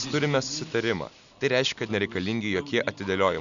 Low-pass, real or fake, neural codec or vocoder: 7.2 kHz; real; none